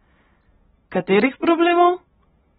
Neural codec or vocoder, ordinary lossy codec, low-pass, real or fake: none; AAC, 16 kbps; 19.8 kHz; real